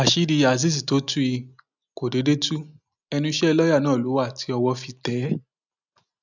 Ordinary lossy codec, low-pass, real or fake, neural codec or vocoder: none; 7.2 kHz; real; none